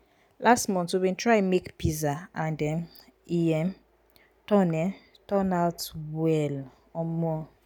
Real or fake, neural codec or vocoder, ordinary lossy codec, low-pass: real; none; none; none